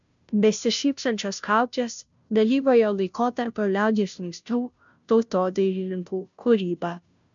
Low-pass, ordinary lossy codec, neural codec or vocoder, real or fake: 7.2 kHz; MP3, 96 kbps; codec, 16 kHz, 0.5 kbps, FunCodec, trained on Chinese and English, 25 frames a second; fake